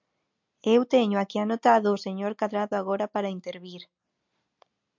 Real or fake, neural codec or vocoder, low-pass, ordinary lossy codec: real; none; 7.2 kHz; MP3, 64 kbps